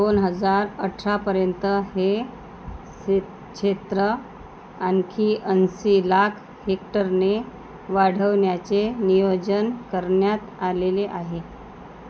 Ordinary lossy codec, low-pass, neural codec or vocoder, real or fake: none; none; none; real